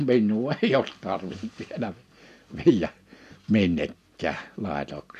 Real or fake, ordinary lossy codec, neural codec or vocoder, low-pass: real; none; none; 14.4 kHz